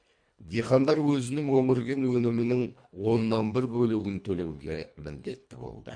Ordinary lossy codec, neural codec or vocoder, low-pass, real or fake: MP3, 64 kbps; codec, 24 kHz, 1.5 kbps, HILCodec; 9.9 kHz; fake